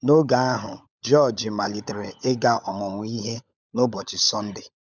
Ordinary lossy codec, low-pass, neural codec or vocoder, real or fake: none; 7.2 kHz; codec, 16 kHz, 16 kbps, FunCodec, trained on LibriTTS, 50 frames a second; fake